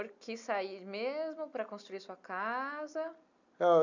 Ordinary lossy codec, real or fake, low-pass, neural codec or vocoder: none; real; 7.2 kHz; none